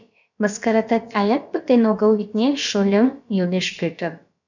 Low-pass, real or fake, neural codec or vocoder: 7.2 kHz; fake; codec, 16 kHz, about 1 kbps, DyCAST, with the encoder's durations